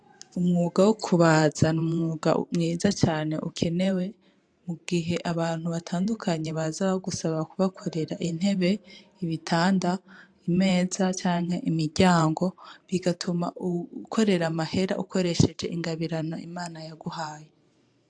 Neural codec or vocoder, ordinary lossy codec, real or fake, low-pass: vocoder, 44.1 kHz, 128 mel bands every 512 samples, BigVGAN v2; AAC, 64 kbps; fake; 9.9 kHz